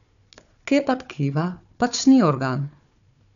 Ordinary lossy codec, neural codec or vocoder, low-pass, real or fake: none; codec, 16 kHz, 4 kbps, FunCodec, trained on Chinese and English, 50 frames a second; 7.2 kHz; fake